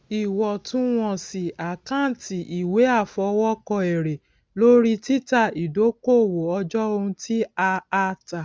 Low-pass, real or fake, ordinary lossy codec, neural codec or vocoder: none; real; none; none